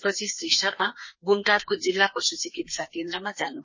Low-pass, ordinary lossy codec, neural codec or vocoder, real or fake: 7.2 kHz; MP3, 32 kbps; codec, 32 kHz, 1.9 kbps, SNAC; fake